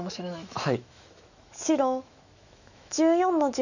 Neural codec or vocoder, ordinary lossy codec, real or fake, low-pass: autoencoder, 48 kHz, 128 numbers a frame, DAC-VAE, trained on Japanese speech; none; fake; 7.2 kHz